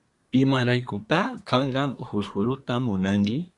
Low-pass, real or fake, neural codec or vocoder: 10.8 kHz; fake; codec, 24 kHz, 1 kbps, SNAC